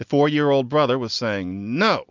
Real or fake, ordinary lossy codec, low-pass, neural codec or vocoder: real; MP3, 64 kbps; 7.2 kHz; none